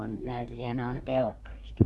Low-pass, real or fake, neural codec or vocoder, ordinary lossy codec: none; fake; codec, 24 kHz, 1 kbps, SNAC; none